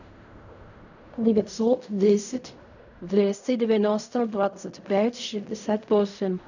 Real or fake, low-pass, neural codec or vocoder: fake; 7.2 kHz; codec, 16 kHz in and 24 kHz out, 0.4 kbps, LongCat-Audio-Codec, fine tuned four codebook decoder